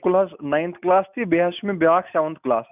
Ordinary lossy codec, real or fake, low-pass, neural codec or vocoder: none; real; 3.6 kHz; none